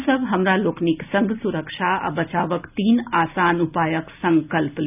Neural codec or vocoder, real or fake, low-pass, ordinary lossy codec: none; real; 3.6 kHz; none